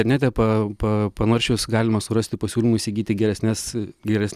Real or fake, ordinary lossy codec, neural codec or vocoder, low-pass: real; Opus, 64 kbps; none; 14.4 kHz